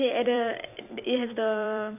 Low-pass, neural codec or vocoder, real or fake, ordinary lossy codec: 3.6 kHz; vocoder, 44.1 kHz, 128 mel bands every 256 samples, BigVGAN v2; fake; none